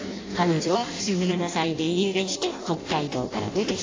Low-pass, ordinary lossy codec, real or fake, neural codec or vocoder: 7.2 kHz; AAC, 32 kbps; fake; codec, 16 kHz in and 24 kHz out, 0.6 kbps, FireRedTTS-2 codec